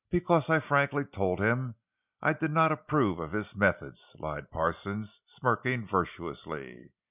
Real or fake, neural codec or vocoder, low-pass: real; none; 3.6 kHz